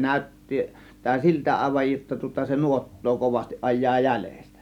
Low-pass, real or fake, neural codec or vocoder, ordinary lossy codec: 19.8 kHz; real; none; none